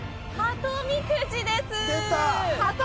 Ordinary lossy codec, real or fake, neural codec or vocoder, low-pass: none; real; none; none